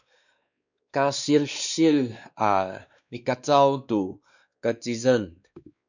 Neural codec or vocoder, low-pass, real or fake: codec, 16 kHz, 2 kbps, X-Codec, WavLM features, trained on Multilingual LibriSpeech; 7.2 kHz; fake